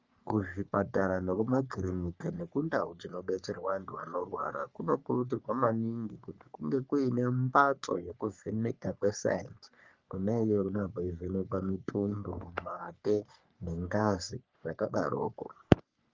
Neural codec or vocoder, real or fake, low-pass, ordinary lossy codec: codec, 44.1 kHz, 3.4 kbps, Pupu-Codec; fake; 7.2 kHz; Opus, 32 kbps